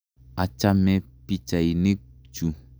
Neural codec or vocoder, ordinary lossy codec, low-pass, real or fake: none; none; none; real